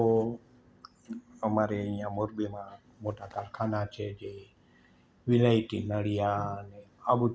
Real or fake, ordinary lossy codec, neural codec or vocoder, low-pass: real; none; none; none